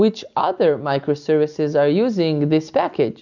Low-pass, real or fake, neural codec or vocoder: 7.2 kHz; real; none